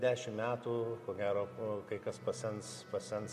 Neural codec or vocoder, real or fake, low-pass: none; real; 14.4 kHz